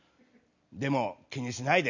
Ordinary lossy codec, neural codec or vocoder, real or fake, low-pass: none; none; real; 7.2 kHz